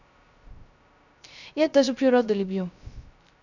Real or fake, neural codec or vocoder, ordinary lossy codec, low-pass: fake; codec, 16 kHz, 0.3 kbps, FocalCodec; none; 7.2 kHz